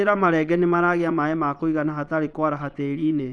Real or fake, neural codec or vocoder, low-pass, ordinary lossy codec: fake; vocoder, 22.05 kHz, 80 mel bands, Vocos; none; none